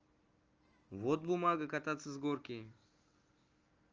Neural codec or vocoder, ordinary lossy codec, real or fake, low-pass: none; Opus, 24 kbps; real; 7.2 kHz